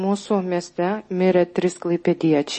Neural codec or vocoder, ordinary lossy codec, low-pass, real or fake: none; MP3, 32 kbps; 9.9 kHz; real